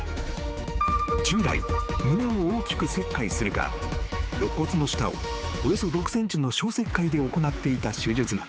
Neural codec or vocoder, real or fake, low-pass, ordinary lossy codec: codec, 16 kHz, 4 kbps, X-Codec, HuBERT features, trained on general audio; fake; none; none